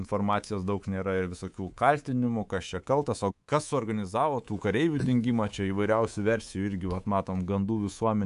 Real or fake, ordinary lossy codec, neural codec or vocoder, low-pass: fake; AAC, 96 kbps; codec, 24 kHz, 3.1 kbps, DualCodec; 10.8 kHz